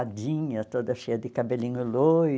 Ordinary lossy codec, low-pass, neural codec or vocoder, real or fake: none; none; none; real